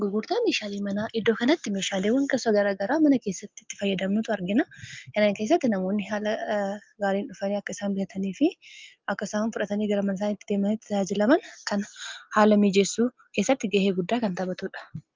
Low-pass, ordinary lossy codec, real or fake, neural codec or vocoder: 7.2 kHz; Opus, 32 kbps; real; none